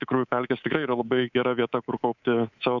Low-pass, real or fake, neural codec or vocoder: 7.2 kHz; fake; codec, 24 kHz, 3.1 kbps, DualCodec